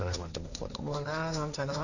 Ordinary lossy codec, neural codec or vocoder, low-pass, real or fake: none; codec, 16 kHz, 1.1 kbps, Voila-Tokenizer; 7.2 kHz; fake